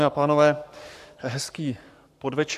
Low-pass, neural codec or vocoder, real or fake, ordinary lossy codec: 14.4 kHz; codec, 44.1 kHz, 7.8 kbps, Pupu-Codec; fake; AAC, 96 kbps